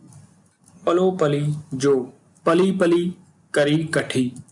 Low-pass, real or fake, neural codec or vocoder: 10.8 kHz; real; none